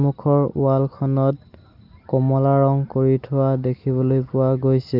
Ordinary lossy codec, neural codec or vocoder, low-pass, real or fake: Opus, 24 kbps; none; 5.4 kHz; real